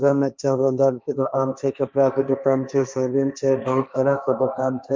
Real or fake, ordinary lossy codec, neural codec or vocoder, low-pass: fake; none; codec, 16 kHz, 1.1 kbps, Voila-Tokenizer; none